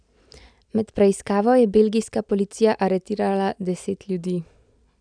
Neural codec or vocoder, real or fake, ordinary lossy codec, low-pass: none; real; none; 9.9 kHz